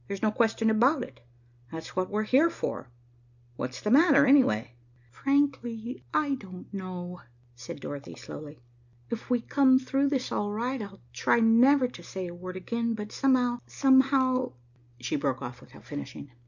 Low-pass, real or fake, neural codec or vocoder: 7.2 kHz; real; none